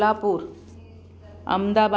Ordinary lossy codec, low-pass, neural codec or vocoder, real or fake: none; none; none; real